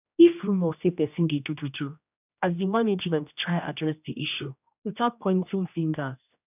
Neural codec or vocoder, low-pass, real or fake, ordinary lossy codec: codec, 16 kHz, 1 kbps, X-Codec, HuBERT features, trained on general audio; 3.6 kHz; fake; none